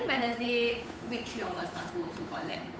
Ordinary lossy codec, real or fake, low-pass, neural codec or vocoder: none; fake; none; codec, 16 kHz, 8 kbps, FunCodec, trained on Chinese and English, 25 frames a second